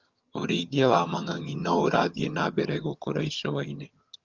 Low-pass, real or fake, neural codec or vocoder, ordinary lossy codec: 7.2 kHz; fake; vocoder, 22.05 kHz, 80 mel bands, HiFi-GAN; Opus, 32 kbps